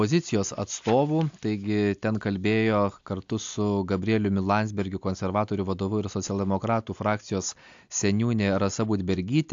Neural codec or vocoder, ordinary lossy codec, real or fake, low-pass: none; AAC, 64 kbps; real; 7.2 kHz